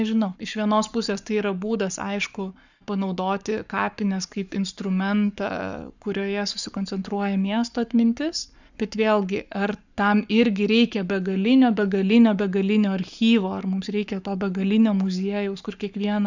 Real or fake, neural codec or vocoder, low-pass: fake; codec, 44.1 kHz, 7.8 kbps, Pupu-Codec; 7.2 kHz